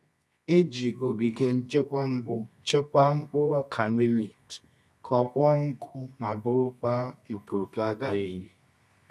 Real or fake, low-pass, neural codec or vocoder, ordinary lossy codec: fake; none; codec, 24 kHz, 0.9 kbps, WavTokenizer, medium music audio release; none